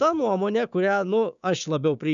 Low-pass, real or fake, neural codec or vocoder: 7.2 kHz; fake; codec, 16 kHz, 6 kbps, DAC